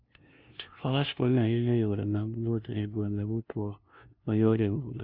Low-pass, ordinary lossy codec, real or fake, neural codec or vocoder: 5.4 kHz; Opus, 64 kbps; fake; codec, 16 kHz, 0.5 kbps, FunCodec, trained on LibriTTS, 25 frames a second